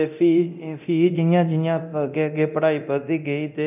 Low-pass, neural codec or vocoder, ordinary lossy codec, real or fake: 3.6 kHz; codec, 24 kHz, 0.9 kbps, DualCodec; none; fake